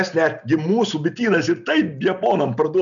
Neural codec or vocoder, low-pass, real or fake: none; 7.2 kHz; real